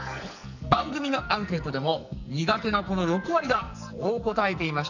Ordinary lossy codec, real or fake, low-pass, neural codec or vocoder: none; fake; 7.2 kHz; codec, 44.1 kHz, 2.6 kbps, SNAC